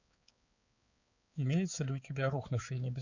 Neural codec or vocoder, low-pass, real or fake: codec, 16 kHz, 4 kbps, X-Codec, HuBERT features, trained on balanced general audio; 7.2 kHz; fake